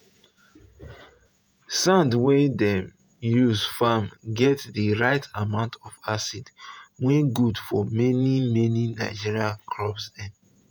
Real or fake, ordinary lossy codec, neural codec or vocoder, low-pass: fake; none; vocoder, 48 kHz, 128 mel bands, Vocos; none